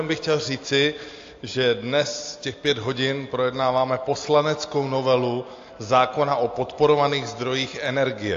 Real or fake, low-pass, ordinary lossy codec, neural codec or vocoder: real; 7.2 kHz; AAC, 48 kbps; none